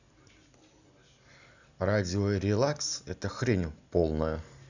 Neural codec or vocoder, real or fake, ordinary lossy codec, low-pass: none; real; none; 7.2 kHz